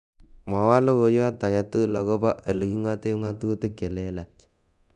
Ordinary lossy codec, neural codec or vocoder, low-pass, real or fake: none; codec, 24 kHz, 0.9 kbps, DualCodec; 10.8 kHz; fake